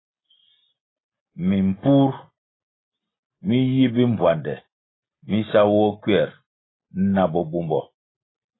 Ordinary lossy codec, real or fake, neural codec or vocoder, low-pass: AAC, 16 kbps; real; none; 7.2 kHz